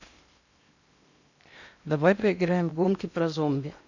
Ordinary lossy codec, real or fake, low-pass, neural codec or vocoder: none; fake; 7.2 kHz; codec, 16 kHz in and 24 kHz out, 0.8 kbps, FocalCodec, streaming, 65536 codes